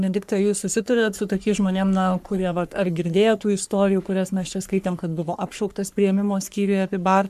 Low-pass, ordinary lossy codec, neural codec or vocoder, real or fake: 14.4 kHz; MP3, 96 kbps; codec, 44.1 kHz, 3.4 kbps, Pupu-Codec; fake